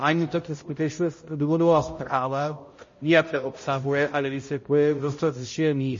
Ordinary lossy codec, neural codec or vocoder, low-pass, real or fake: MP3, 32 kbps; codec, 16 kHz, 0.5 kbps, X-Codec, HuBERT features, trained on balanced general audio; 7.2 kHz; fake